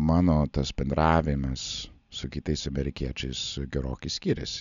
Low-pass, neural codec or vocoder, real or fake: 7.2 kHz; none; real